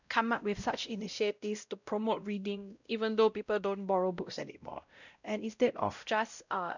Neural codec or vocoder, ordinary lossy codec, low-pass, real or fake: codec, 16 kHz, 0.5 kbps, X-Codec, WavLM features, trained on Multilingual LibriSpeech; none; 7.2 kHz; fake